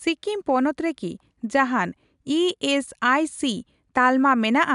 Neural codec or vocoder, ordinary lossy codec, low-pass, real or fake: none; none; 10.8 kHz; real